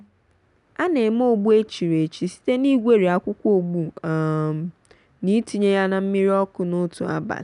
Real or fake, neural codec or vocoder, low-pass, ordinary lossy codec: real; none; 10.8 kHz; none